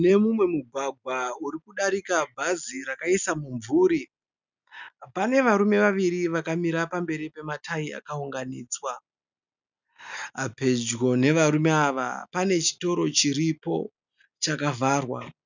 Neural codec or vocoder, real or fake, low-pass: none; real; 7.2 kHz